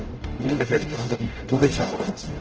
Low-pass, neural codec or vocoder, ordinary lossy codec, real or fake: 7.2 kHz; codec, 44.1 kHz, 0.9 kbps, DAC; Opus, 16 kbps; fake